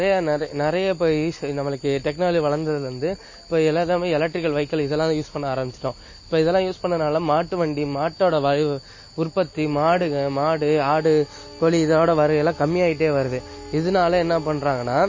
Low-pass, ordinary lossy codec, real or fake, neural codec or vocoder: 7.2 kHz; MP3, 32 kbps; real; none